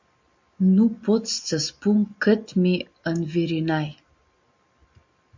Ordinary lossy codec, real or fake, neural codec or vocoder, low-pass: MP3, 64 kbps; real; none; 7.2 kHz